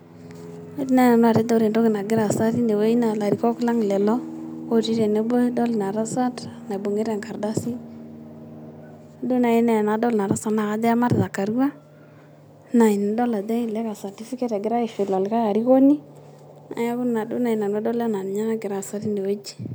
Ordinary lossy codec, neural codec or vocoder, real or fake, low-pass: none; none; real; none